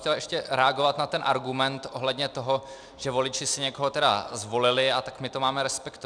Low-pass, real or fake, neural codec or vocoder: 9.9 kHz; real; none